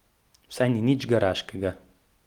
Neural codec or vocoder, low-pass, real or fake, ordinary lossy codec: none; 19.8 kHz; real; Opus, 24 kbps